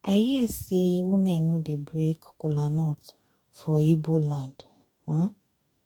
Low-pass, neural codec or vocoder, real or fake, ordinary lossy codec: 19.8 kHz; codec, 44.1 kHz, 2.6 kbps, DAC; fake; MP3, 96 kbps